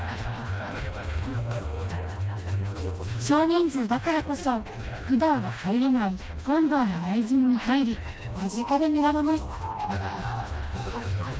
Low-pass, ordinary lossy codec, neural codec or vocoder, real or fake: none; none; codec, 16 kHz, 1 kbps, FreqCodec, smaller model; fake